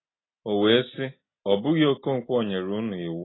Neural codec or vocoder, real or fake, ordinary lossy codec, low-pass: none; real; AAC, 16 kbps; 7.2 kHz